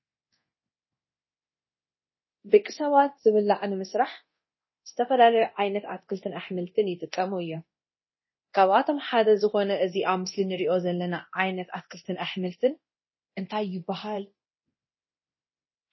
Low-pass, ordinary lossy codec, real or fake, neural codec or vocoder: 7.2 kHz; MP3, 24 kbps; fake; codec, 24 kHz, 0.9 kbps, DualCodec